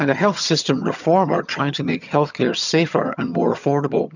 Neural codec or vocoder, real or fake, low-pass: vocoder, 22.05 kHz, 80 mel bands, HiFi-GAN; fake; 7.2 kHz